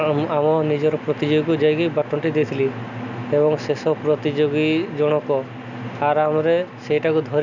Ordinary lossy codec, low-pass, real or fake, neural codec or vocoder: none; 7.2 kHz; real; none